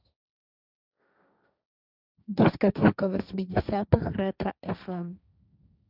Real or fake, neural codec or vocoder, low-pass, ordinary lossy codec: fake; codec, 16 kHz, 1.1 kbps, Voila-Tokenizer; 5.4 kHz; none